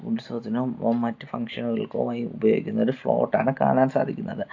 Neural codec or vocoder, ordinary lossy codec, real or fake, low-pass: none; AAC, 48 kbps; real; 7.2 kHz